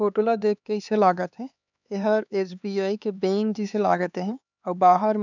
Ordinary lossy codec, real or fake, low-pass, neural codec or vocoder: none; fake; 7.2 kHz; codec, 16 kHz, 4 kbps, X-Codec, HuBERT features, trained on LibriSpeech